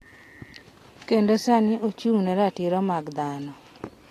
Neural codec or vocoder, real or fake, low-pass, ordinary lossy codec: none; real; 14.4 kHz; AAC, 48 kbps